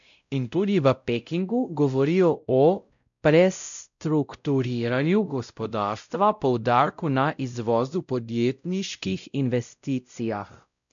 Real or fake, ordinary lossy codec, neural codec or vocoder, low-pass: fake; none; codec, 16 kHz, 0.5 kbps, X-Codec, WavLM features, trained on Multilingual LibriSpeech; 7.2 kHz